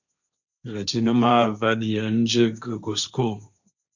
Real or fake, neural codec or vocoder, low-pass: fake; codec, 16 kHz, 1.1 kbps, Voila-Tokenizer; 7.2 kHz